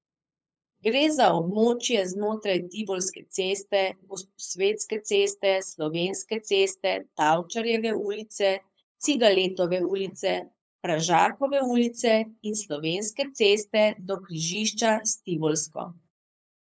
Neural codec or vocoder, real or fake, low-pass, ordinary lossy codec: codec, 16 kHz, 8 kbps, FunCodec, trained on LibriTTS, 25 frames a second; fake; none; none